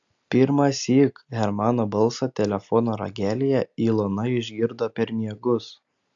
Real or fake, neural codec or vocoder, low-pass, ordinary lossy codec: real; none; 7.2 kHz; AAC, 64 kbps